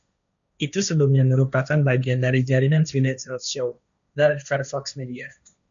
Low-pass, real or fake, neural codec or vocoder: 7.2 kHz; fake; codec, 16 kHz, 1.1 kbps, Voila-Tokenizer